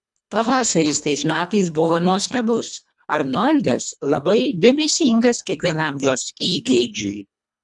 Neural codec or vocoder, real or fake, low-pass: codec, 24 kHz, 1.5 kbps, HILCodec; fake; 10.8 kHz